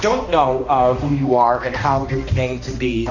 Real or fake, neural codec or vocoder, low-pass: fake; codec, 16 kHz, 1 kbps, X-Codec, HuBERT features, trained on balanced general audio; 7.2 kHz